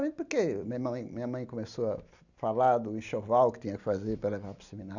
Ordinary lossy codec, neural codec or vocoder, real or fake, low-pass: none; none; real; 7.2 kHz